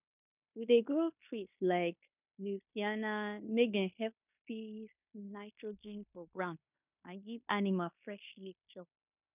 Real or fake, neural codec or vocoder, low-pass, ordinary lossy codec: fake; codec, 16 kHz in and 24 kHz out, 0.9 kbps, LongCat-Audio-Codec, fine tuned four codebook decoder; 3.6 kHz; none